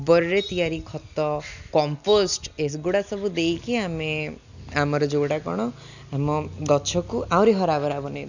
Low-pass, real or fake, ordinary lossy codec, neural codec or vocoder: 7.2 kHz; real; none; none